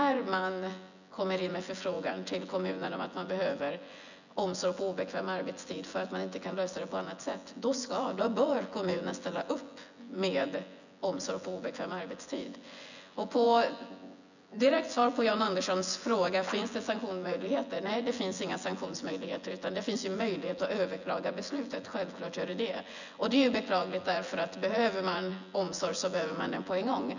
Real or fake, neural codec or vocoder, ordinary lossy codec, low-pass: fake; vocoder, 24 kHz, 100 mel bands, Vocos; none; 7.2 kHz